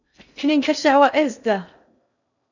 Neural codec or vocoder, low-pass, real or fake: codec, 16 kHz in and 24 kHz out, 0.6 kbps, FocalCodec, streaming, 2048 codes; 7.2 kHz; fake